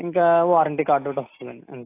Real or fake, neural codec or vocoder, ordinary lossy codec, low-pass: real; none; AAC, 24 kbps; 3.6 kHz